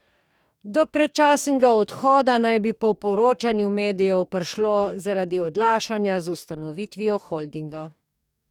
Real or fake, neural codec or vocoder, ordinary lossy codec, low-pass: fake; codec, 44.1 kHz, 2.6 kbps, DAC; none; 19.8 kHz